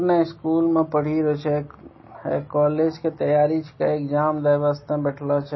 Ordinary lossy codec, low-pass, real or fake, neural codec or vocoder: MP3, 24 kbps; 7.2 kHz; real; none